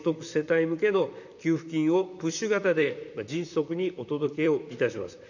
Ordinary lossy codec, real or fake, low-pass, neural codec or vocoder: none; fake; 7.2 kHz; vocoder, 44.1 kHz, 128 mel bands, Pupu-Vocoder